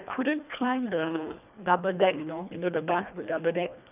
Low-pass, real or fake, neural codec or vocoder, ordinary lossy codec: 3.6 kHz; fake; codec, 24 kHz, 1.5 kbps, HILCodec; none